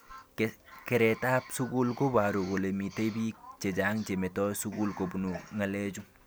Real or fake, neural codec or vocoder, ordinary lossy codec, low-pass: real; none; none; none